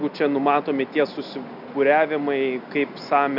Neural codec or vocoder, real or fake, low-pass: none; real; 5.4 kHz